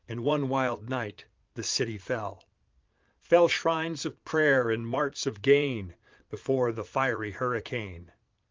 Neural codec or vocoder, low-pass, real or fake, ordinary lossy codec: vocoder, 22.05 kHz, 80 mel bands, Vocos; 7.2 kHz; fake; Opus, 32 kbps